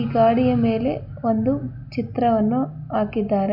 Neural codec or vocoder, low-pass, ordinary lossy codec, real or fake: none; 5.4 kHz; none; real